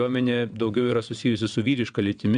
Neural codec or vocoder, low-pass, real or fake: vocoder, 22.05 kHz, 80 mel bands, WaveNeXt; 9.9 kHz; fake